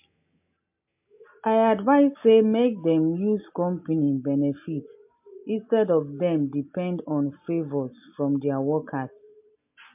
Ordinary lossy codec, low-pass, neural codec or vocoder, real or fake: MP3, 32 kbps; 3.6 kHz; none; real